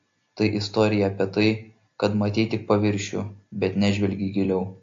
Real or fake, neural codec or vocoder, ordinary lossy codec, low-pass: real; none; AAC, 48 kbps; 7.2 kHz